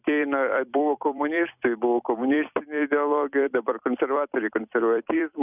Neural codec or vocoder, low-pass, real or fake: vocoder, 44.1 kHz, 128 mel bands every 256 samples, BigVGAN v2; 3.6 kHz; fake